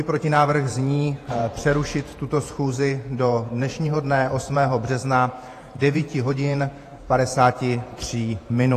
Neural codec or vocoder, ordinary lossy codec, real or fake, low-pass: vocoder, 48 kHz, 128 mel bands, Vocos; AAC, 48 kbps; fake; 14.4 kHz